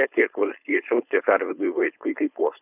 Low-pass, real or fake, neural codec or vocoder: 3.6 kHz; fake; codec, 16 kHz, 4.8 kbps, FACodec